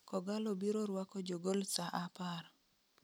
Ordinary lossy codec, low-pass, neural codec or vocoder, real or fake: none; none; none; real